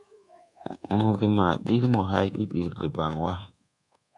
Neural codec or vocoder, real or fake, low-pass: codec, 24 kHz, 1.2 kbps, DualCodec; fake; 10.8 kHz